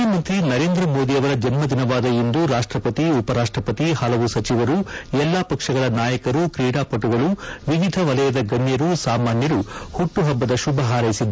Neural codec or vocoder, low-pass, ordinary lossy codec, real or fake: none; none; none; real